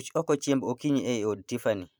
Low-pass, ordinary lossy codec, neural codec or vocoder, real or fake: none; none; vocoder, 44.1 kHz, 128 mel bands every 512 samples, BigVGAN v2; fake